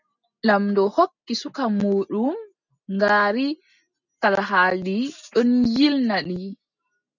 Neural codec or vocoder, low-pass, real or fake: none; 7.2 kHz; real